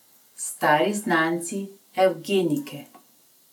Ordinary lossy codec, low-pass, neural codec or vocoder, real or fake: none; 19.8 kHz; none; real